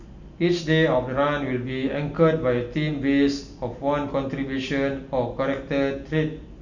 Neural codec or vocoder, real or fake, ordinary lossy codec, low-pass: none; real; none; 7.2 kHz